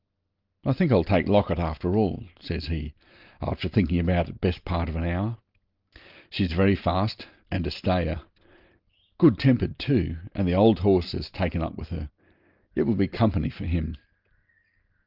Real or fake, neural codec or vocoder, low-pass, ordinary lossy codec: real; none; 5.4 kHz; Opus, 32 kbps